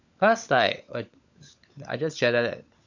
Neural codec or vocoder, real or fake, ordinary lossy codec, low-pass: codec, 16 kHz, 16 kbps, FunCodec, trained on LibriTTS, 50 frames a second; fake; none; 7.2 kHz